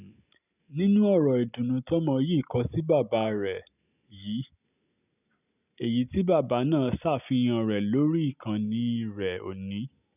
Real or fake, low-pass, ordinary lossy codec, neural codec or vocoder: real; 3.6 kHz; none; none